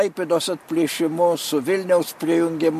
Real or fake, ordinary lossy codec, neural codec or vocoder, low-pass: real; MP3, 64 kbps; none; 14.4 kHz